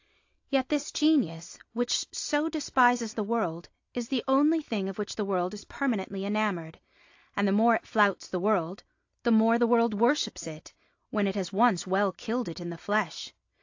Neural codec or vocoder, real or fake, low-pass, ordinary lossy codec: none; real; 7.2 kHz; AAC, 48 kbps